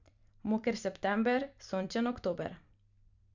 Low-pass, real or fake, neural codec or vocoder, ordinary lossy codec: 7.2 kHz; fake; vocoder, 44.1 kHz, 128 mel bands every 256 samples, BigVGAN v2; Opus, 64 kbps